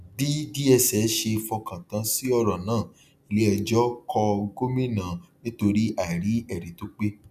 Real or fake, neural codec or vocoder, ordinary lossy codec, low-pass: real; none; none; 14.4 kHz